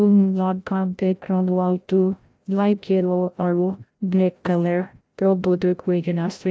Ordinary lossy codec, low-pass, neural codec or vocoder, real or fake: none; none; codec, 16 kHz, 0.5 kbps, FreqCodec, larger model; fake